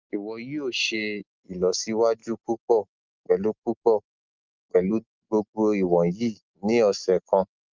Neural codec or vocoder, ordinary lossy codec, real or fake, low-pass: none; Opus, 24 kbps; real; 7.2 kHz